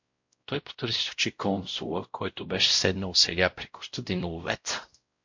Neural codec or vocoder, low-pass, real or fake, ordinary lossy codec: codec, 16 kHz, 0.5 kbps, X-Codec, WavLM features, trained on Multilingual LibriSpeech; 7.2 kHz; fake; MP3, 48 kbps